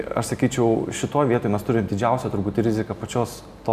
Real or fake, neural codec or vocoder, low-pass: real; none; 14.4 kHz